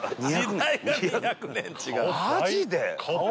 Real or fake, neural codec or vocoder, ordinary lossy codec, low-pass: real; none; none; none